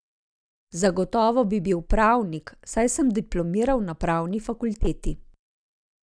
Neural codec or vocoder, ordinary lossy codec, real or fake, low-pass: none; none; real; 9.9 kHz